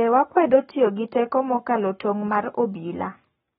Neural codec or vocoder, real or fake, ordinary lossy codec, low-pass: codec, 44.1 kHz, 7.8 kbps, Pupu-Codec; fake; AAC, 16 kbps; 19.8 kHz